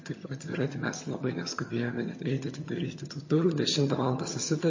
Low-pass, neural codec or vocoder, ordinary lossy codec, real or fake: 7.2 kHz; vocoder, 22.05 kHz, 80 mel bands, HiFi-GAN; MP3, 32 kbps; fake